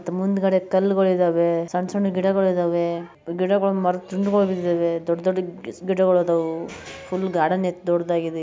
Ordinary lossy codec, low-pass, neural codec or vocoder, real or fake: none; none; none; real